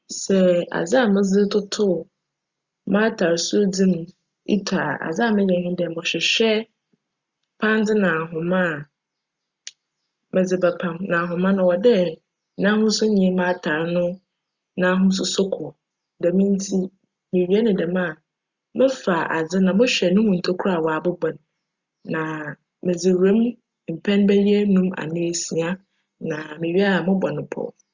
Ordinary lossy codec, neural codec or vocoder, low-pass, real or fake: Opus, 64 kbps; none; 7.2 kHz; real